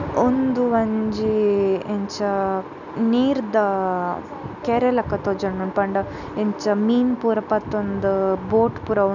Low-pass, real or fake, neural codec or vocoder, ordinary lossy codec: 7.2 kHz; real; none; none